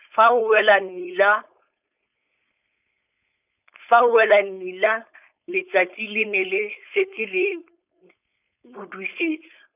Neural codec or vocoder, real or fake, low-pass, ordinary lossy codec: codec, 16 kHz, 4.8 kbps, FACodec; fake; 3.6 kHz; none